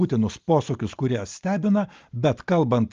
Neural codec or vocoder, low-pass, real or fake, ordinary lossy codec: none; 7.2 kHz; real; Opus, 24 kbps